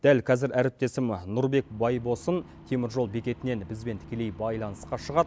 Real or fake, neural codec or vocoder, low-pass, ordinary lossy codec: real; none; none; none